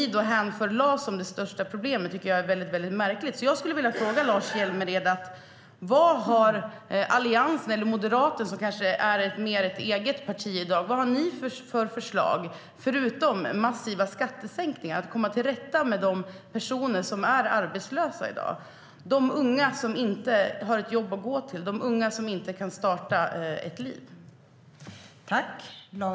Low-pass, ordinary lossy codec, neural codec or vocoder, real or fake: none; none; none; real